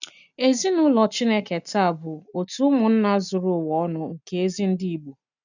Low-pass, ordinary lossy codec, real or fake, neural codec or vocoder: 7.2 kHz; none; real; none